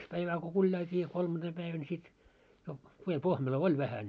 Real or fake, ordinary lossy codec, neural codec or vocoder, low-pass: real; none; none; none